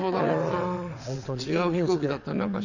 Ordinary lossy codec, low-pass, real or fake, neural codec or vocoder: none; 7.2 kHz; fake; vocoder, 22.05 kHz, 80 mel bands, WaveNeXt